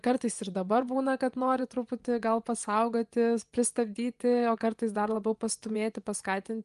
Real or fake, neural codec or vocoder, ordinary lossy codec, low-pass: fake; vocoder, 24 kHz, 100 mel bands, Vocos; Opus, 32 kbps; 10.8 kHz